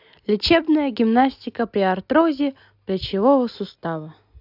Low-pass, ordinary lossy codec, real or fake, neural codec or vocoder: 5.4 kHz; AAC, 48 kbps; real; none